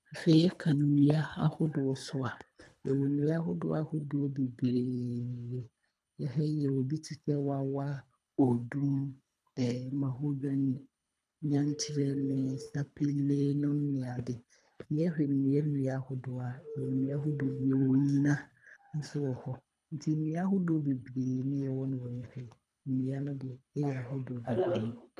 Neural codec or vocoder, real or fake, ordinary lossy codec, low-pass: codec, 24 kHz, 3 kbps, HILCodec; fake; none; none